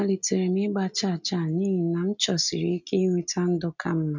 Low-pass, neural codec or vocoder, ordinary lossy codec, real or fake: 7.2 kHz; none; none; real